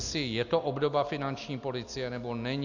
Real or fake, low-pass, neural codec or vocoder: real; 7.2 kHz; none